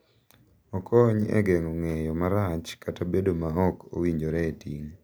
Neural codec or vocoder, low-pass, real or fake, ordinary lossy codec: none; none; real; none